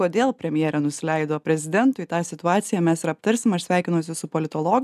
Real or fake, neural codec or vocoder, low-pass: real; none; 14.4 kHz